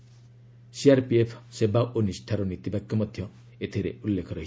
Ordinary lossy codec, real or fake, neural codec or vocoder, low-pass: none; real; none; none